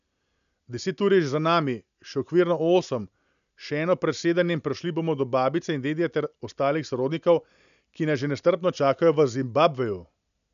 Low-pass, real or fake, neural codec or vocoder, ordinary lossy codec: 7.2 kHz; real; none; none